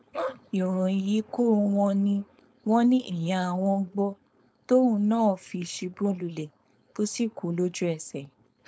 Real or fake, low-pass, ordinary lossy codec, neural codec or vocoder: fake; none; none; codec, 16 kHz, 4.8 kbps, FACodec